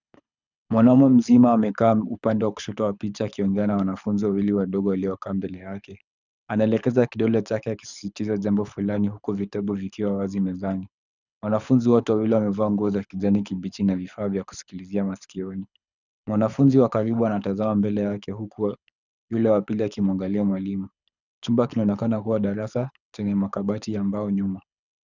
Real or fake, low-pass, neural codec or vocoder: fake; 7.2 kHz; codec, 24 kHz, 6 kbps, HILCodec